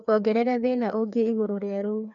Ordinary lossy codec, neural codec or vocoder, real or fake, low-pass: none; codec, 16 kHz, 2 kbps, FreqCodec, larger model; fake; 7.2 kHz